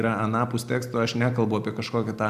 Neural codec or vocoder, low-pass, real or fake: none; 14.4 kHz; real